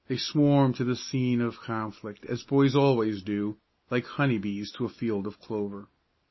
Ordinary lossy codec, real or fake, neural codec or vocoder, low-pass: MP3, 24 kbps; real; none; 7.2 kHz